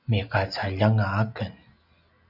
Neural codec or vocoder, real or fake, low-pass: none; real; 5.4 kHz